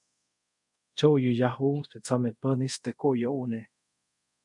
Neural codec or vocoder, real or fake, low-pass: codec, 24 kHz, 0.5 kbps, DualCodec; fake; 10.8 kHz